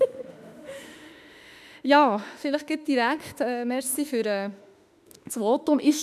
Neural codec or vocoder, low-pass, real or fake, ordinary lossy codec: autoencoder, 48 kHz, 32 numbers a frame, DAC-VAE, trained on Japanese speech; 14.4 kHz; fake; none